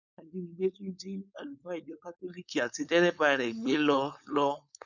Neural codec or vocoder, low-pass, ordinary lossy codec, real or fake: codec, 16 kHz, 4.8 kbps, FACodec; 7.2 kHz; none; fake